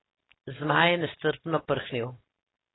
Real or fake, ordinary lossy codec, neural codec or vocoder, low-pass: real; AAC, 16 kbps; none; 7.2 kHz